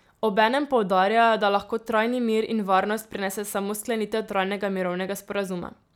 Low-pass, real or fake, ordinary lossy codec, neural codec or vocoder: 19.8 kHz; real; none; none